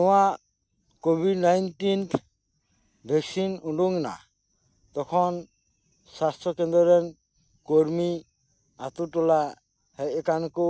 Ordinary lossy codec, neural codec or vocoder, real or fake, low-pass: none; none; real; none